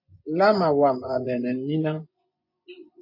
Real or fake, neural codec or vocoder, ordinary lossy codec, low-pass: fake; vocoder, 24 kHz, 100 mel bands, Vocos; MP3, 32 kbps; 5.4 kHz